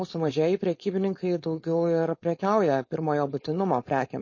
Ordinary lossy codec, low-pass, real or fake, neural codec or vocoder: MP3, 32 kbps; 7.2 kHz; fake; codec, 16 kHz, 4.8 kbps, FACodec